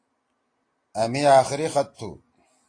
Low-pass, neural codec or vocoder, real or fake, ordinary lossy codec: 9.9 kHz; none; real; AAC, 32 kbps